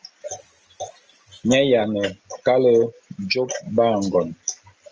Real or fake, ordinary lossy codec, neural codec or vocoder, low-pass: real; Opus, 24 kbps; none; 7.2 kHz